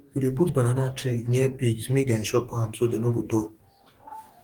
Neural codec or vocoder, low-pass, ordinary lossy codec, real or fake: codec, 44.1 kHz, 2.6 kbps, DAC; 19.8 kHz; Opus, 32 kbps; fake